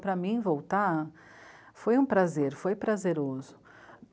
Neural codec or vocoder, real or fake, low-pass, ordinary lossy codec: none; real; none; none